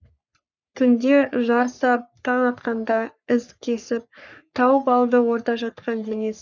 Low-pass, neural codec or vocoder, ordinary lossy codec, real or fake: 7.2 kHz; codec, 44.1 kHz, 3.4 kbps, Pupu-Codec; none; fake